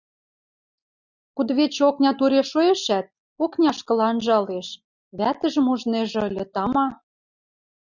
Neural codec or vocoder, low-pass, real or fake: none; 7.2 kHz; real